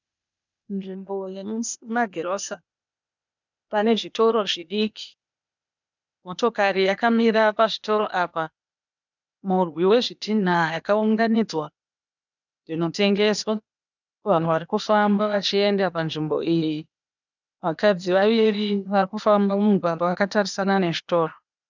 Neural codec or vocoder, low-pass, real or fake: codec, 16 kHz, 0.8 kbps, ZipCodec; 7.2 kHz; fake